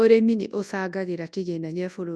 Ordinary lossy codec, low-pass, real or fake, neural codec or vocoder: none; none; fake; codec, 24 kHz, 0.9 kbps, WavTokenizer, large speech release